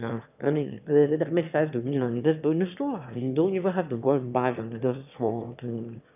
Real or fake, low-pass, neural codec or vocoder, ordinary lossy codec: fake; 3.6 kHz; autoencoder, 22.05 kHz, a latent of 192 numbers a frame, VITS, trained on one speaker; none